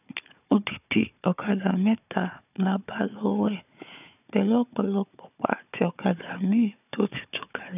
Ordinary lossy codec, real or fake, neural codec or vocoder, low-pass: none; fake; codec, 16 kHz, 4 kbps, FunCodec, trained on Chinese and English, 50 frames a second; 3.6 kHz